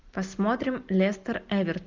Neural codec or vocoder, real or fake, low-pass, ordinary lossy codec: none; real; 7.2 kHz; Opus, 24 kbps